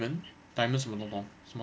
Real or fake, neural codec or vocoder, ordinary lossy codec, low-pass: real; none; none; none